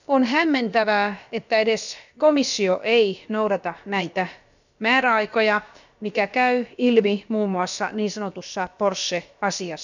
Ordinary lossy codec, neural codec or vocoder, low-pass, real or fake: none; codec, 16 kHz, about 1 kbps, DyCAST, with the encoder's durations; 7.2 kHz; fake